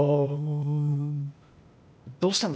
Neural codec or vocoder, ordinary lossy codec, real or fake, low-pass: codec, 16 kHz, 0.8 kbps, ZipCodec; none; fake; none